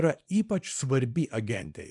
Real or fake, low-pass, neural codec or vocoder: fake; 10.8 kHz; codec, 24 kHz, 0.9 kbps, WavTokenizer, small release